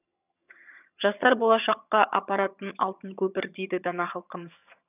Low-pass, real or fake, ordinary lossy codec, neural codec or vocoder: 3.6 kHz; fake; none; vocoder, 22.05 kHz, 80 mel bands, HiFi-GAN